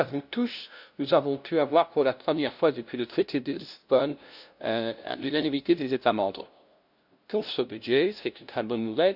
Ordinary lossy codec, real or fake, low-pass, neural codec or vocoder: none; fake; 5.4 kHz; codec, 16 kHz, 0.5 kbps, FunCodec, trained on LibriTTS, 25 frames a second